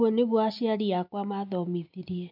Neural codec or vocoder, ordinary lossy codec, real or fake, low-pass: none; none; real; 5.4 kHz